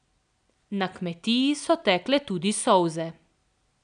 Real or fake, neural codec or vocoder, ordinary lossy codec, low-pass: real; none; none; 9.9 kHz